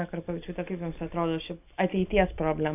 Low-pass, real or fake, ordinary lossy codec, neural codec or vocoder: 3.6 kHz; real; AAC, 32 kbps; none